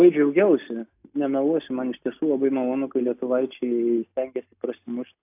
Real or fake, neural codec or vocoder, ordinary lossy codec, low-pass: real; none; AAC, 32 kbps; 3.6 kHz